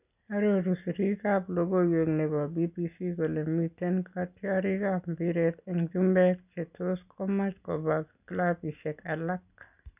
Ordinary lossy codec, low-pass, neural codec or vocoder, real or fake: none; 3.6 kHz; none; real